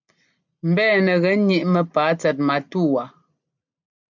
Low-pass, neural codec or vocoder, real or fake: 7.2 kHz; none; real